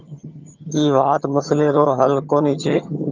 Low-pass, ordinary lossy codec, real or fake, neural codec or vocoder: 7.2 kHz; Opus, 24 kbps; fake; vocoder, 22.05 kHz, 80 mel bands, HiFi-GAN